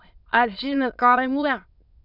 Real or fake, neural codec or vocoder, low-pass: fake; autoencoder, 22.05 kHz, a latent of 192 numbers a frame, VITS, trained on many speakers; 5.4 kHz